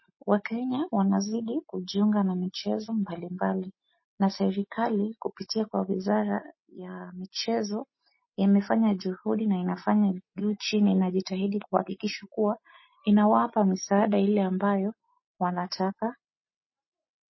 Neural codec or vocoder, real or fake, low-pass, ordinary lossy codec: none; real; 7.2 kHz; MP3, 24 kbps